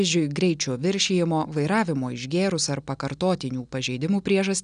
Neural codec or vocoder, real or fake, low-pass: none; real; 9.9 kHz